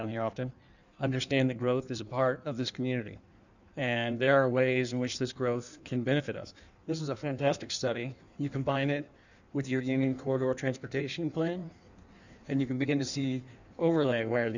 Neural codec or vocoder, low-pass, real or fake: codec, 16 kHz in and 24 kHz out, 1.1 kbps, FireRedTTS-2 codec; 7.2 kHz; fake